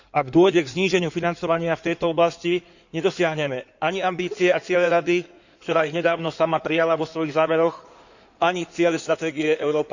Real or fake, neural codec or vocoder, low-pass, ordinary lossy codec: fake; codec, 16 kHz in and 24 kHz out, 2.2 kbps, FireRedTTS-2 codec; 7.2 kHz; none